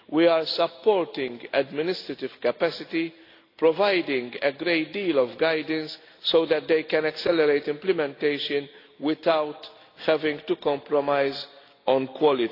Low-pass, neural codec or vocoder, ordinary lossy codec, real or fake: 5.4 kHz; none; AAC, 32 kbps; real